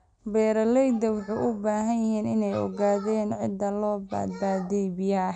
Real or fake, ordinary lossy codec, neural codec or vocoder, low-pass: real; none; none; 9.9 kHz